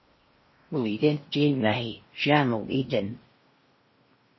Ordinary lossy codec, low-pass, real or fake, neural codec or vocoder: MP3, 24 kbps; 7.2 kHz; fake; codec, 16 kHz in and 24 kHz out, 0.6 kbps, FocalCodec, streaming, 4096 codes